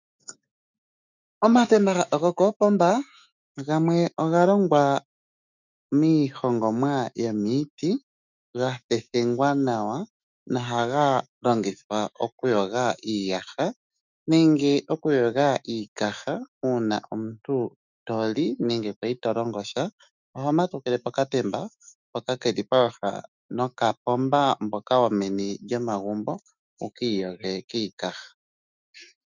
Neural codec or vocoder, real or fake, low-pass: autoencoder, 48 kHz, 128 numbers a frame, DAC-VAE, trained on Japanese speech; fake; 7.2 kHz